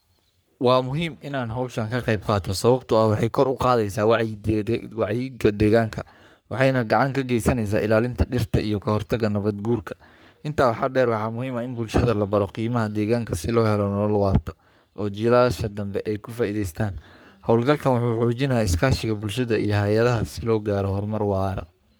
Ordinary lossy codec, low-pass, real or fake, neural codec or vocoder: none; none; fake; codec, 44.1 kHz, 3.4 kbps, Pupu-Codec